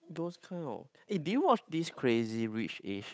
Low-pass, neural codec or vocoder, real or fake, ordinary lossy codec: none; codec, 16 kHz, 8 kbps, FunCodec, trained on Chinese and English, 25 frames a second; fake; none